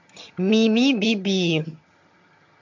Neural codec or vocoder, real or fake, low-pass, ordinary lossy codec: vocoder, 22.05 kHz, 80 mel bands, HiFi-GAN; fake; 7.2 kHz; MP3, 48 kbps